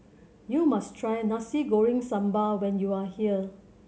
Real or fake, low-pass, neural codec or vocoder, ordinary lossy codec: real; none; none; none